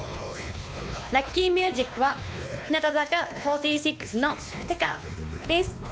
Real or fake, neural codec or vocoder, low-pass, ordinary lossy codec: fake; codec, 16 kHz, 2 kbps, X-Codec, WavLM features, trained on Multilingual LibriSpeech; none; none